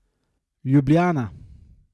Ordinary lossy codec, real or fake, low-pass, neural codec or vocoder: none; real; none; none